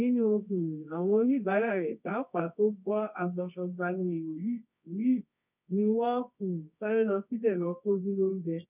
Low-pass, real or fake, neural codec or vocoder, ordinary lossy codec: 3.6 kHz; fake; codec, 24 kHz, 0.9 kbps, WavTokenizer, medium music audio release; none